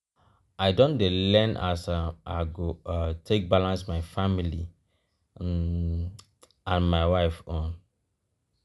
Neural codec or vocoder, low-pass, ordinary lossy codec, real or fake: none; none; none; real